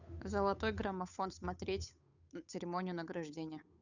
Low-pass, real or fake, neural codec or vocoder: 7.2 kHz; fake; codec, 16 kHz, 8 kbps, FunCodec, trained on Chinese and English, 25 frames a second